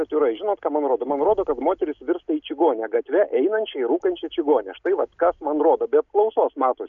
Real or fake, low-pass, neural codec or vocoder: real; 7.2 kHz; none